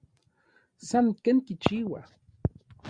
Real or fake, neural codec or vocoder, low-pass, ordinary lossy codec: real; none; 9.9 kHz; Opus, 64 kbps